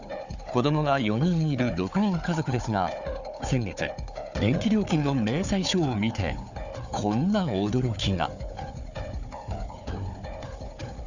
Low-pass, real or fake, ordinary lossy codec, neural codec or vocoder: 7.2 kHz; fake; none; codec, 16 kHz, 4 kbps, FunCodec, trained on Chinese and English, 50 frames a second